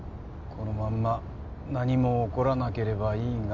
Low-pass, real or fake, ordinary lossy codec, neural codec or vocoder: 7.2 kHz; real; none; none